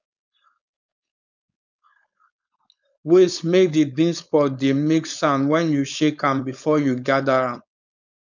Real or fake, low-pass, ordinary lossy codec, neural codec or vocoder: fake; 7.2 kHz; none; codec, 16 kHz, 4.8 kbps, FACodec